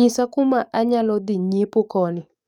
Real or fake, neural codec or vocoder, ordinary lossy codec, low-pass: fake; codec, 44.1 kHz, 7.8 kbps, DAC; none; 19.8 kHz